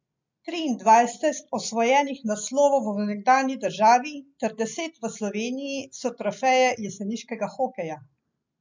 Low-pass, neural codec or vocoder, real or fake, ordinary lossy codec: 7.2 kHz; none; real; MP3, 64 kbps